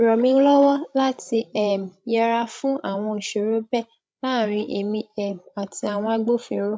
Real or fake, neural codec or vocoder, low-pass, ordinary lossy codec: fake; codec, 16 kHz, 16 kbps, FreqCodec, larger model; none; none